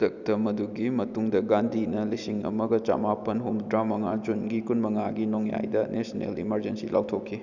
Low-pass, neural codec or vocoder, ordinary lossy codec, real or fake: 7.2 kHz; none; none; real